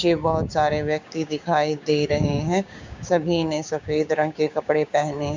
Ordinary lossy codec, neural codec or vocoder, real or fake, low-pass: MP3, 64 kbps; codec, 16 kHz, 6 kbps, DAC; fake; 7.2 kHz